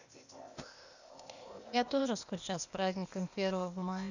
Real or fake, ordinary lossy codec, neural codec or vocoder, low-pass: fake; none; codec, 16 kHz, 0.8 kbps, ZipCodec; 7.2 kHz